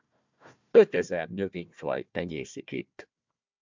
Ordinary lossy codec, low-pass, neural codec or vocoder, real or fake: MP3, 64 kbps; 7.2 kHz; codec, 16 kHz, 1 kbps, FunCodec, trained on Chinese and English, 50 frames a second; fake